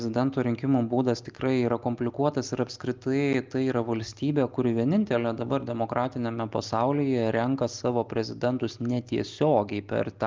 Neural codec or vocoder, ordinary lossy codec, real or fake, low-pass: none; Opus, 32 kbps; real; 7.2 kHz